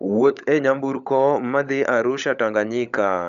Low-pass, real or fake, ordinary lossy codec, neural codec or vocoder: 7.2 kHz; fake; none; codec, 16 kHz, 16 kbps, FunCodec, trained on LibriTTS, 50 frames a second